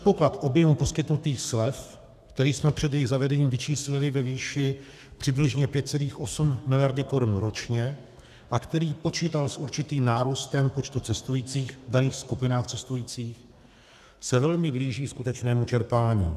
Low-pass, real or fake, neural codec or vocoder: 14.4 kHz; fake; codec, 32 kHz, 1.9 kbps, SNAC